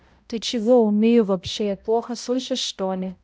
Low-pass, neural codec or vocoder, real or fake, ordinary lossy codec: none; codec, 16 kHz, 0.5 kbps, X-Codec, HuBERT features, trained on balanced general audio; fake; none